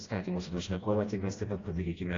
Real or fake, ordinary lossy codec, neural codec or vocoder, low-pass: fake; AAC, 32 kbps; codec, 16 kHz, 1 kbps, FreqCodec, smaller model; 7.2 kHz